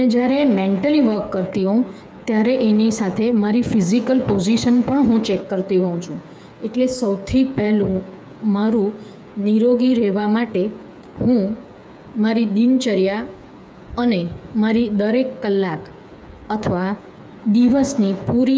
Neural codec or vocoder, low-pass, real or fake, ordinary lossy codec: codec, 16 kHz, 8 kbps, FreqCodec, smaller model; none; fake; none